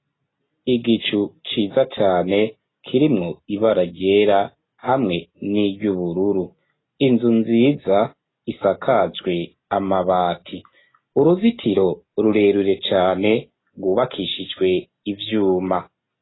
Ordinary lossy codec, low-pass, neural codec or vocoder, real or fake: AAC, 16 kbps; 7.2 kHz; none; real